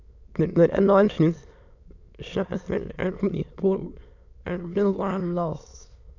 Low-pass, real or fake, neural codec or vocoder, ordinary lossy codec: 7.2 kHz; fake; autoencoder, 22.05 kHz, a latent of 192 numbers a frame, VITS, trained on many speakers; none